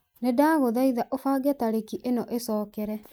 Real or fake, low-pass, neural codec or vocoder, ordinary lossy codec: real; none; none; none